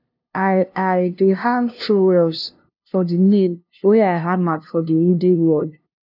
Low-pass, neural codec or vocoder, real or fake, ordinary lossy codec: 5.4 kHz; codec, 16 kHz, 0.5 kbps, FunCodec, trained on LibriTTS, 25 frames a second; fake; none